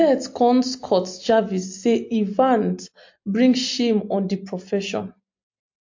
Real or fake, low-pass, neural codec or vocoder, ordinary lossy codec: real; 7.2 kHz; none; MP3, 48 kbps